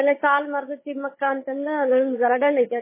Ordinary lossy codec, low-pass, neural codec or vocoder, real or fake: MP3, 16 kbps; 3.6 kHz; codec, 16 kHz, 16 kbps, FunCodec, trained on Chinese and English, 50 frames a second; fake